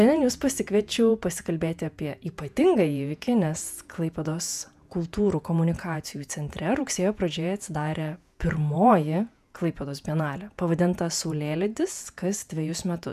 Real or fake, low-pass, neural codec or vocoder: fake; 14.4 kHz; vocoder, 48 kHz, 128 mel bands, Vocos